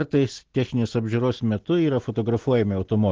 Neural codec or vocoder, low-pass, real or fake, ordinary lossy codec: none; 7.2 kHz; real; Opus, 16 kbps